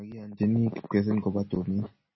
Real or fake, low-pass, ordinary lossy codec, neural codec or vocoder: real; 7.2 kHz; MP3, 24 kbps; none